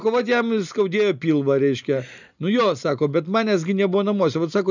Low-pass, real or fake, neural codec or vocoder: 7.2 kHz; real; none